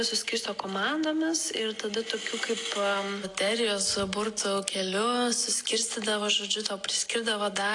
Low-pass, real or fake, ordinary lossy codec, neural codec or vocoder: 10.8 kHz; real; AAC, 48 kbps; none